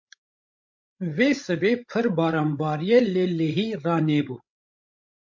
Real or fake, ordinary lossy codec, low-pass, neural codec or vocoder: fake; MP3, 48 kbps; 7.2 kHz; codec, 16 kHz, 16 kbps, FreqCodec, larger model